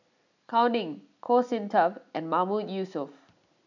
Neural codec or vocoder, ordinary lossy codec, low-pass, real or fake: none; none; 7.2 kHz; real